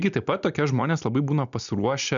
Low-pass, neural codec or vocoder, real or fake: 7.2 kHz; none; real